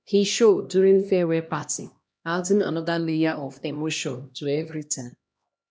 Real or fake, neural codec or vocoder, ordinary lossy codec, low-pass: fake; codec, 16 kHz, 1 kbps, X-Codec, HuBERT features, trained on LibriSpeech; none; none